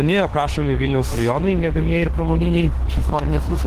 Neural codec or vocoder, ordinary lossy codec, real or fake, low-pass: autoencoder, 48 kHz, 32 numbers a frame, DAC-VAE, trained on Japanese speech; Opus, 16 kbps; fake; 14.4 kHz